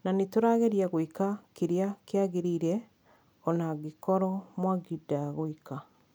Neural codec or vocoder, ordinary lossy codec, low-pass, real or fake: none; none; none; real